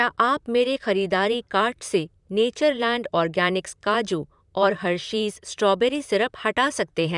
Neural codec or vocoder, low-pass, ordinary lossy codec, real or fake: vocoder, 44.1 kHz, 128 mel bands, Pupu-Vocoder; 10.8 kHz; none; fake